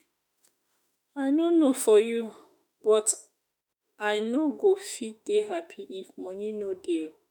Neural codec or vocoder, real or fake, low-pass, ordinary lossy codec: autoencoder, 48 kHz, 32 numbers a frame, DAC-VAE, trained on Japanese speech; fake; none; none